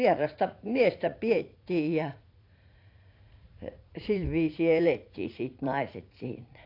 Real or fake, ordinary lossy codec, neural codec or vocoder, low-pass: fake; AAC, 32 kbps; vocoder, 44.1 kHz, 80 mel bands, Vocos; 5.4 kHz